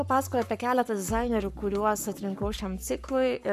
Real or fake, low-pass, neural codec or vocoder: fake; 14.4 kHz; codec, 44.1 kHz, 7.8 kbps, Pupu-Codec